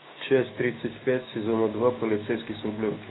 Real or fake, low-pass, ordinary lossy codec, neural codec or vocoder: fake; 7.2 kHz; AAC, 16 kbps; codec, 16 kHz, 6 kbps, DAC